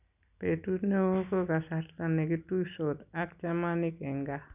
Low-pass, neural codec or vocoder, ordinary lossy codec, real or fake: 3.6 kHz; none; none; real